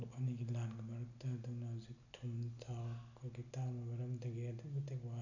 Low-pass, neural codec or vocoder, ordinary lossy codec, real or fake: 7.2 kHz; none; none; real